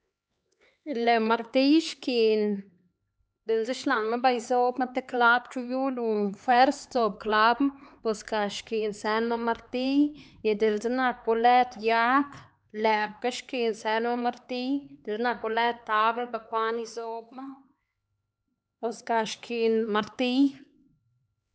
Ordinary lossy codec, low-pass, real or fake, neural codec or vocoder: none; none; fake; codec, 16 kHz, 4 kbps, X-Codec, HuBERT features, trained on LibriSpeech